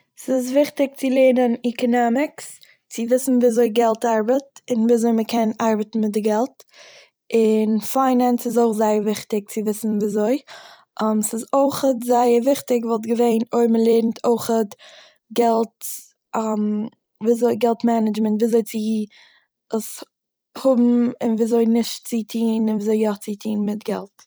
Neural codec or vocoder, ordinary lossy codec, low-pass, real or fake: vocoder, 44.1 kHz, 128 mel bands every 256 samples, BigVGAN v2; none; none; fake